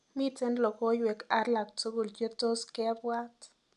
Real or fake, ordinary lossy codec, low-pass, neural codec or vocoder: real; none; 10.8 kHz; none